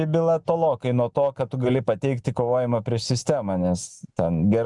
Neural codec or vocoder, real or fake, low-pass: none; real; 10.8 kHz